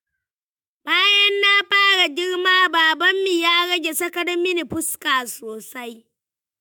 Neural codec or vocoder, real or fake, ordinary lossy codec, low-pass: autoencoder, 48 kHz, 128 numbers a frame, DAC-VAE, trained on Japanese speech; fake; MP3, 96 kbps; 19.8 kHz